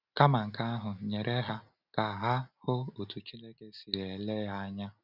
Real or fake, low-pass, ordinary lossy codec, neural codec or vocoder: real; 5.4 kHz; none; none